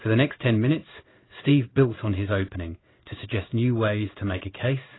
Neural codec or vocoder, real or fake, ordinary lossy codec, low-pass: codec, 16 kHz in and 24 kHz out, 1 kbps, XY-Tokenizer; fake; AAC, 16 kbps; 7.2 kHz